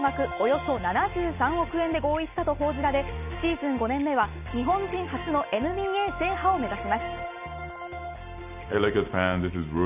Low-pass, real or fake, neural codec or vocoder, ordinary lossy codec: 3.6 kHz; real; none; none